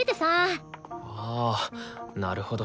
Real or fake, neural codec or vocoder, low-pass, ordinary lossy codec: real; none; none; none